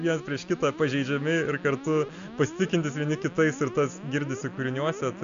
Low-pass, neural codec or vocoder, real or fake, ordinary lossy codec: 7.2 kHz; none; real; MP3, 64 kbps